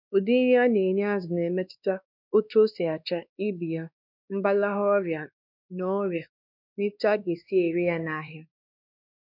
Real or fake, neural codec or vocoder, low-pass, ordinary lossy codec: fake; codec, 16 kHz, 2 kbps, X-Codec, WavLM features, trained on Multilingual LibriSpeech; 5.4 kHz; none